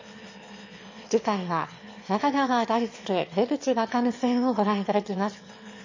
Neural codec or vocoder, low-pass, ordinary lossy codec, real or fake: autoencoder, 22.05 kHz, a latent of 192 numbers a frame, VITS, trained on one speaker; 7.2 kHz; MP3, 32 kbps; fake